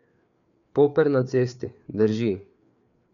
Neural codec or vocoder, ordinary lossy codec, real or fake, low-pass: codec, 16 kHz, 4 kbps, FreqCodec, larger model; none; fake; 7.2 kHz